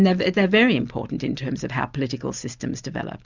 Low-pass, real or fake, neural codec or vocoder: 7.2 kHz; real; none